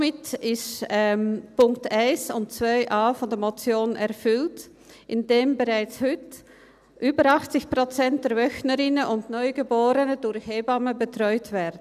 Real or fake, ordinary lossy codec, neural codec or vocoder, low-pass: real; none; none; 14.4 kHz